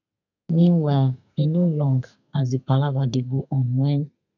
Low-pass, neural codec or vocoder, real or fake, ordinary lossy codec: 7.2 kHz; codec, 32 kHz, 1.9 kbps, SNAC; fake; none